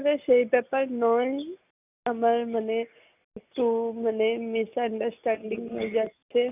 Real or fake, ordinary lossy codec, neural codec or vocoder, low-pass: real; none; none; 3.6 kHz